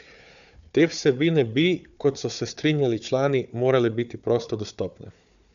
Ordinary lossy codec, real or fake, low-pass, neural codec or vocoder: none; fake; 7.2 kHz; codec, 16 kHz, 4 kbps, FunCodec, trained on Chinese and English, 50 frames a second